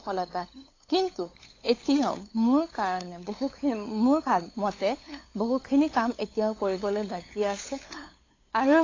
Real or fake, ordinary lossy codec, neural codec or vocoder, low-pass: fake; AAC, 32 kbps; codec, 16 kHz, 8 kbps, FunCodec, trained on LibriTTS, 25 frames a second; 7.2 kHz